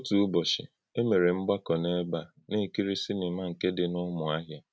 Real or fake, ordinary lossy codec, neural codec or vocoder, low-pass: real; none; none; none